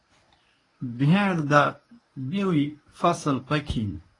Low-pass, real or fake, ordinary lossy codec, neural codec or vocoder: 10.8 kHz; fake; AAC, 32 kbps; codec, 24 kHz, 0.9 kbps, WavTokenizer, medium speech release version 1